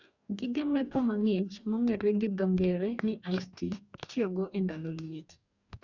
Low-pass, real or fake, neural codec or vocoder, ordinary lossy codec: 7.2 kHz; fake; codec, 44.1 kHz, 2.6 kbps, DAC; none